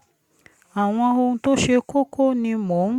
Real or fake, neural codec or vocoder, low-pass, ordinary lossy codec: real; none; 19.8 kHz; Opus, 64 kbps